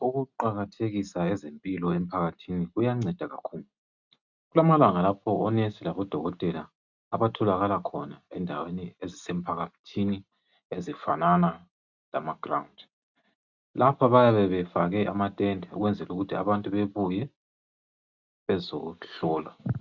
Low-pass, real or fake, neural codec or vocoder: 7.2 kHz; real; none